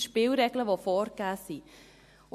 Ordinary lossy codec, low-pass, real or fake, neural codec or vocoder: MP3, 64 kbps; 14.4 kHz; real; none